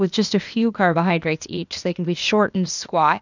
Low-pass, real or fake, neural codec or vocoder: 7.2 kHz; fake; codec, 16 kHz, 0.8 kbps, ZipCodec